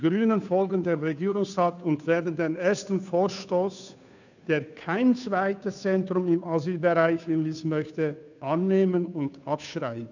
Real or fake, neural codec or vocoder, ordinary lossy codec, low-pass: fake; codec, 16 kHz, 2 kbps, FunCodec, trained on Chinese and English, 25 frames a second; none; 7.2 kHz